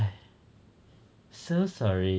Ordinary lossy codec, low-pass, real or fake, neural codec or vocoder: none; none; real; none